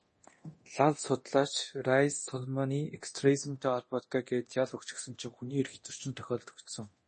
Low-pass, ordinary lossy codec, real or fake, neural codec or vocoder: 10.8 kHz; MP3, 32 kbps; fake; codec, 24 kHz, 0.9 kbps, DualCodec